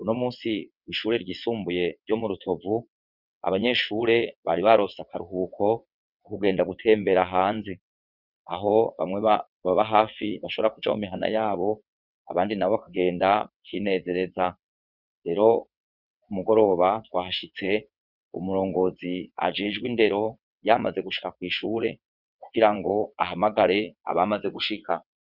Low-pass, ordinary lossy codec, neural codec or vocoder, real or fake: 5.4 kHz; Opus, 64 kbps; vocoder, 22.05 kHz, 80 mel bands, WaveNeXt; fake